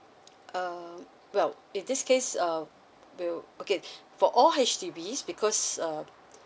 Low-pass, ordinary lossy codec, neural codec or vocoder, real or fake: none; none; none; real